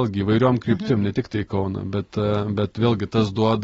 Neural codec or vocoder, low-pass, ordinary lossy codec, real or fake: none; 9.9 kHz; AAC, 24 kbps; real